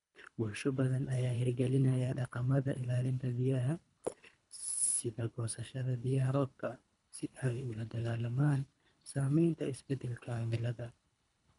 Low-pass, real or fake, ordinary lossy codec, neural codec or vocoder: 10.8 kHz; fake; none; codec, 24 kHz, 3 kbps, HILCodec